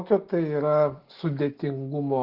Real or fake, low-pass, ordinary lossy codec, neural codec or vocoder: real; 5.4 kHz; Opus, 16 kbps; none